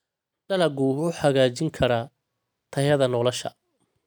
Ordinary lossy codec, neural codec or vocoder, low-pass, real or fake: none; none; none; real